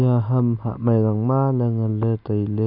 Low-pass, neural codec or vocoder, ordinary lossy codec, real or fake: 5.4 kHz; none; none; real